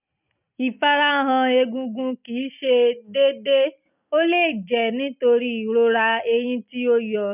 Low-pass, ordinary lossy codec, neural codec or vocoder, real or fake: 3.6 kHz; none; none; real